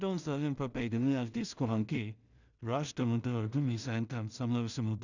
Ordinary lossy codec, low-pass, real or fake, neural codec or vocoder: none; 7.2 kHz; fake; codec, 16 kHz in and 24 kHz out, 0.4 kbps, LongCat-Audio-Codec, two codebook decoder